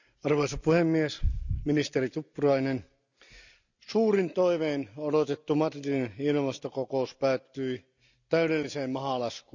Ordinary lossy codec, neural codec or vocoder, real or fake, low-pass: none; none; real; 7.2 kHz